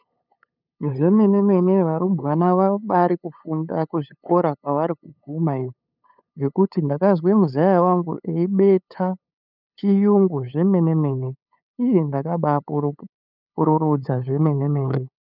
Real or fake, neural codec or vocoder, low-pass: fake; codec, 16 kHz, 8 kbps, FunCodec, trained on LibriTTS, 25 frames a second; 5.4 kHz